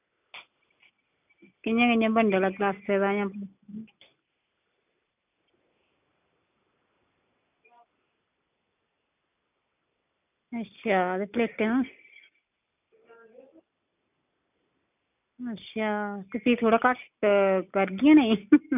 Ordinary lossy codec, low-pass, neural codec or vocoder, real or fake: none; 3.6 kHz; none; real